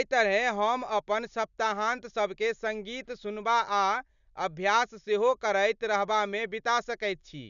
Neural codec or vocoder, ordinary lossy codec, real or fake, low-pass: none; none; real; 7.2 kHz